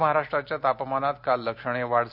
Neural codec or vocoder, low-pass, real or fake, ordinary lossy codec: none; 5.4 kHz; real; none